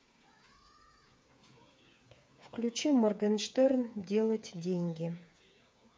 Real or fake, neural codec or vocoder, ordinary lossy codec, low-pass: fake; codec, 16 kHz, 8 kbps, FreqCodec, smaller model; none; none